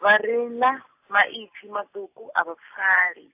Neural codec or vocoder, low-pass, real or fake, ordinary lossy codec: none; 3.6 kHz; real; AAC, 32 kbps